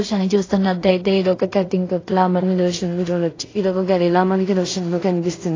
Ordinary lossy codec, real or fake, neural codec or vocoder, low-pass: AAC, 32 kbps; fake; codec, 16 kHz in and 24 kHz out, 0.4 kbps, LongCat-Audio-Codec, two codebook decoder; 7.2 kHz